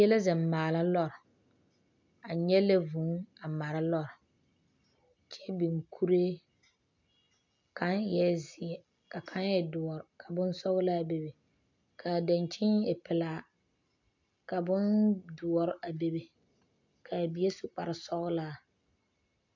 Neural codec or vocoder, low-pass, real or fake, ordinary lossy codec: none; 7.2 kHz; real; MP3, 64 kbps